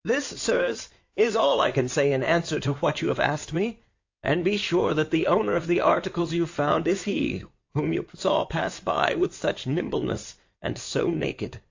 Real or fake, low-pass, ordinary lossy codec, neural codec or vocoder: fake; 7.2 kHz; AAC, 48 kbps; codec, 16 kHz in and 24 kHz out, 2.2 kbps, FireRedTTS-2 codec